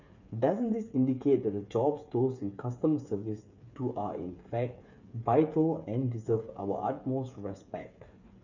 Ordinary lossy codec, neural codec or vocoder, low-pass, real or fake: none; codec, 16 kHz, 8 kbps, FreqCodec, smaller model; 7.2 kHz; fake